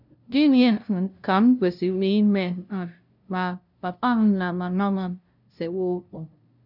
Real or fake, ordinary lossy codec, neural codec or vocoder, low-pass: fake; none; codec, 16 kHz, 0.5 kbps, FunCodec, trained on LibriTTS, 25 frames a second; 5.4 kHz